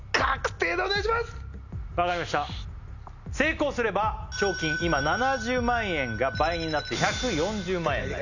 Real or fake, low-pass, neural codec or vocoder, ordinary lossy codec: real; 7.2 kHz; none; none